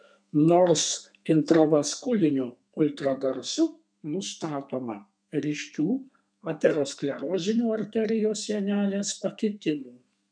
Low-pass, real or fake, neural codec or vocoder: 9.9 kHz; fake; codec, 32 kHz, 1.9 kbps, SNAC